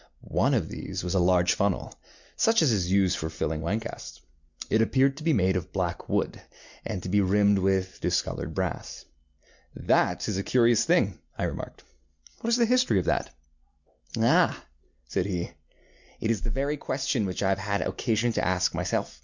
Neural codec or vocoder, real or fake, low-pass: none; real; 7.2 kHz